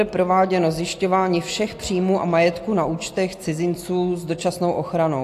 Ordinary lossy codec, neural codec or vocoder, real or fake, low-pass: AAC, 64 kbps; none; real; 14.4 kHz